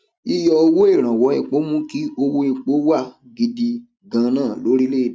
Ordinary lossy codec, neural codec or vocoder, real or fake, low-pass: none; none; real; none